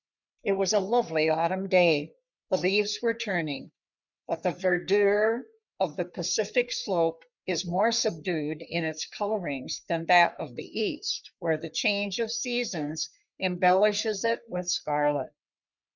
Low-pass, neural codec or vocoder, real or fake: 7.2 kHz; codec, 44.1 kHz, 3.4 kbps, Pupu-Codec; fake